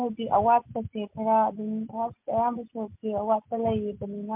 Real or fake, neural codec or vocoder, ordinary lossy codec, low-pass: real; none; MP3, 32 kbps; 3.6 kHz